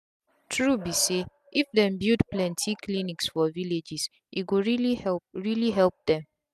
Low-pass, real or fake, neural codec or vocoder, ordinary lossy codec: 14.4 kHz; real; none; none